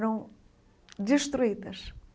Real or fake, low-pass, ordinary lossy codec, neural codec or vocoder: real; none; none; none